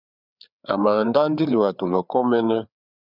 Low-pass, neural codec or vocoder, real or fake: 5.4 kHz; codec, 16 kHz, 4 kbps, FreqCodec, larger model; fake